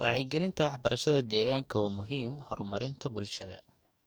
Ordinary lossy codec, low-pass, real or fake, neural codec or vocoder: none; none; fake; codec, 44.1 kHz, 2.6 kbps, DAC